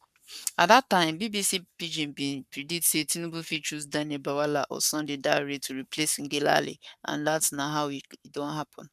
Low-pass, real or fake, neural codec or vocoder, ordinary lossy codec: 14.4 kHz; fake; codec, 44.1 kHz, 7.8 kbps, Pupu-Codec; none